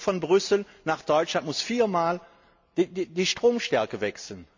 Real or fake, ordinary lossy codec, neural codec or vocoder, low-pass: real; MP3, 64 kbps; none; 7.2 kHz